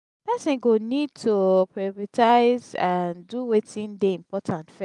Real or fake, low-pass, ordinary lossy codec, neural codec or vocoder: real; 10.8 kHz; none; none